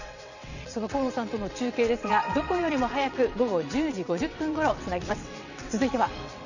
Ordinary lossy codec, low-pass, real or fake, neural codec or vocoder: none; 7.2 kHz; fake; vocoder, 22.05 kHz, 80 mel bands, WaveNeXt